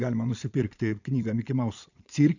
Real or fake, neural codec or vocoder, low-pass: fake; vocoder, 22.05 kHz, 80 mel bands, Vocos; 7.2 kHz